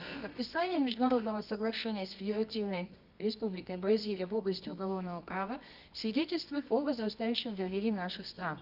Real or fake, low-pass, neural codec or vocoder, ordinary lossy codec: fake; 5.4 kHz; codec, 24 kHz, 0.9 kbps, WavTokenizer, medium music audio release; none